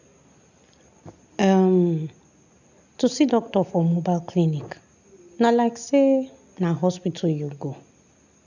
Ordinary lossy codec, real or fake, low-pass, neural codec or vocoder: none; real; 7.2 kHz; none